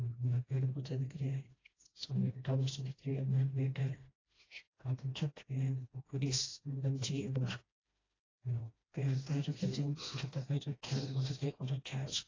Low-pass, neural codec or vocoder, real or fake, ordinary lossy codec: 7.2 kHz; codec, 16 kHz, 1 kbps, FreqCodec, smaller model; fake; AAC, 32 kbps